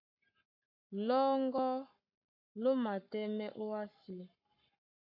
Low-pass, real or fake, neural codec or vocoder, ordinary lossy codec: 5.4 kHz; fake; codec, 44.1 kHz, 7.8 kbps, Pupu-Codec; MP3, 48 kbps